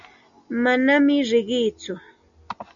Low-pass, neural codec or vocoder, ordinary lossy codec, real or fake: 7.2 kHz; none; MP3, 96 kbps; real